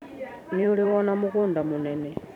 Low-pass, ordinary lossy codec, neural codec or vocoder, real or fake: 19.8 kHz; none; vocoder, 44.1 kHz, 128 mel bands every 512 samples, BigVGAN v2; fake